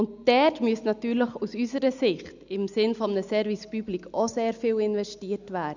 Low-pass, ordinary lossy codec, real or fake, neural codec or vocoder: 7.2 kHz; none; real; none